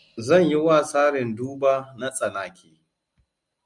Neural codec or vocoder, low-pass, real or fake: none; 10.8 kHz; real